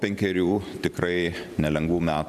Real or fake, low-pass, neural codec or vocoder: fake; 14.4 kHz; vocoder, 44.1 kHz, 128 mel bands every 512 samples, BigVGAN v2